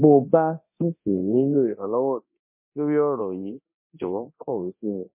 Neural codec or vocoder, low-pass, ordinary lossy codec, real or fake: codec, 24 kHz, 0.9 kbps, DualCodec; 3.6 kHz; MP3, 24 kbps; fake